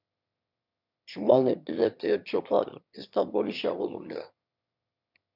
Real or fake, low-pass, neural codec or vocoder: fake; 5.4 kHz; autoencoder, 22.05 kHz, a latent of 192 numbers a frame, VITS, trained on one speaker